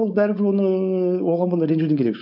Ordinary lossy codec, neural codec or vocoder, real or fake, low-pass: AAC, 48 kbps; codec, 16 kHz, 4.8 kbps, FACodec; fake; 5.4 kHz